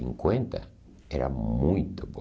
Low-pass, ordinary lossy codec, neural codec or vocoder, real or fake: none; none; none; real